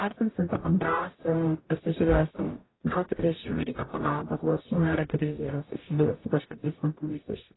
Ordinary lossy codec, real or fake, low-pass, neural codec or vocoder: AAC, 16 kbps; fake; 7.2 kHz; codec, 44.1 kHz, 0.9 kbps, DAC